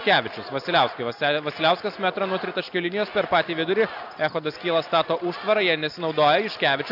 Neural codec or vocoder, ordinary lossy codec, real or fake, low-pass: none; AAC, 48 kbps; real; 5.4 kHz